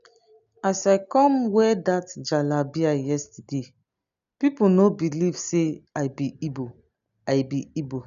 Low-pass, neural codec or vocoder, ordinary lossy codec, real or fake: 7.2 kHz; none; none; real